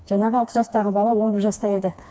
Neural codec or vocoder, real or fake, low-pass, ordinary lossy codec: codec, 16 kHz, 2 kbps, FreqCodec, smaller model; fake; none; none